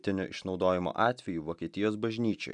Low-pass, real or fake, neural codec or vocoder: 10.8 kHz; real; none